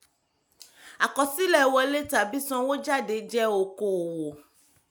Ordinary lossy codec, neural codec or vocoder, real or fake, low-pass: none; none; real; none